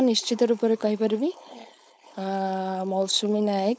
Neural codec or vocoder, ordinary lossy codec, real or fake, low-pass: codec, 16 kHz, 4.8 kbps, FACodec; none; fake; none